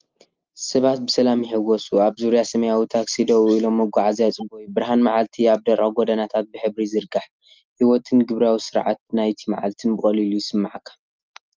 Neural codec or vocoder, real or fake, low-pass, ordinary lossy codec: none; real; 7.2 kHz; Opus, 32 kbps